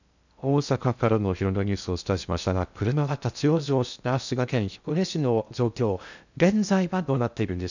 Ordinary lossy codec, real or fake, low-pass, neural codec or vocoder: none; fake; 7.2 kHz; codec, 16 kHz in and 24 kHz out, 0.6 kbps, FocalCodec, streaming, 2048 codes